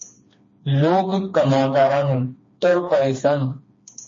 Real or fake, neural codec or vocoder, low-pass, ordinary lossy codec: fake; codec, 16 kHz, 2 kbps, FreqCodec, smaller model; 7.2 kHz; MP3, 32 kbps